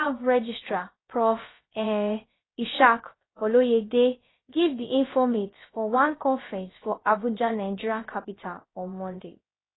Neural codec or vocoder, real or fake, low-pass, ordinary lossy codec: codec, 16 kHz, 0.3 kbps, FocalCodec; fake; 7.2 kHz; AAC, 16 kbps